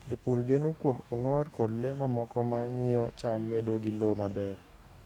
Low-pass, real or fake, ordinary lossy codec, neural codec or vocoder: 19.8 kHz; fake; none; codec, 44.1 kHz, 2.6 kbps, DAC